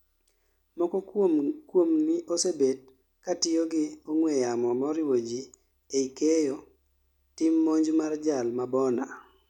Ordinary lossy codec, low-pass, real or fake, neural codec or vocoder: none; 19.8 kHz; real; none